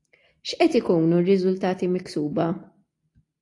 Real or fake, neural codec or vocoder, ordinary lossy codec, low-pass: real; none; AAC, 48 kbps; 10.8 kHz